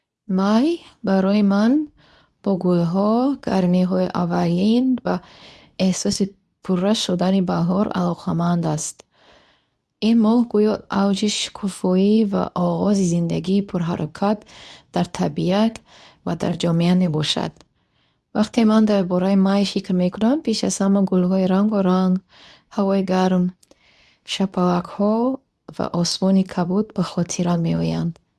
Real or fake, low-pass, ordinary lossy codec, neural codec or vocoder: fake; none; none; codec, 24 kHz, 0.9 kbps, WavTokenizer, medium speech release version 2